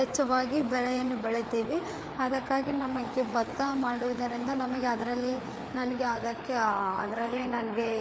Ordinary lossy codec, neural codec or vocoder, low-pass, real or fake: none; codec, 16 kHz, 4 kbps, FreqCodec, larger model; none; fake